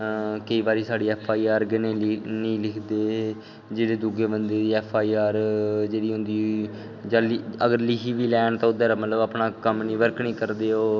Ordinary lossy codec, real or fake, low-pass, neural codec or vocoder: none; real; 7.2 kHz; none